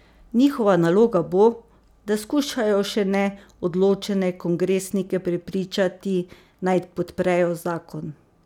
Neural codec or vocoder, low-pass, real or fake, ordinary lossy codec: none; 19.8 kHz; real; none